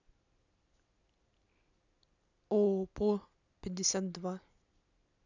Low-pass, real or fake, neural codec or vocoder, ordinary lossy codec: 7.2 kHz; real; none; none